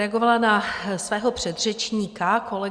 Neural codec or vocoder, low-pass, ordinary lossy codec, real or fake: none; 9.9 kHz; Opus, 64 kbps; real